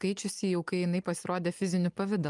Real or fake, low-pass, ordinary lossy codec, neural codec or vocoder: real; 10.8 kHz; Opus, 32 kbps; none